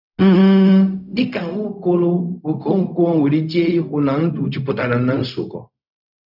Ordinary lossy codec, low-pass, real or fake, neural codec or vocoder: none; 5.4 kHz; fake; codec, 16 kHz, 0.4 kbps, LongCat-Audio-Codec